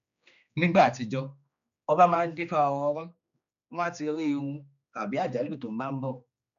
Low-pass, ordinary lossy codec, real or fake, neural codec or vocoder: 7.2 kHz; none; fake; codec, 16 kHz, 2 kbps, X-Codec, HuBERT features, trained on general audio